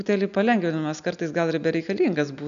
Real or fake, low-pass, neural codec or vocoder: real; 7.2 kHz; none